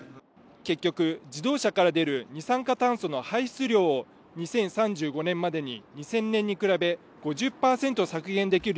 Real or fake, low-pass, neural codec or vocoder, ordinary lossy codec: real; none; none; none